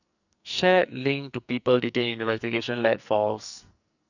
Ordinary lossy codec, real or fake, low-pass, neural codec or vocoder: none; fake; 7.2 kHz; codec, 44.1 kHz, 2.6 kbps, SNAC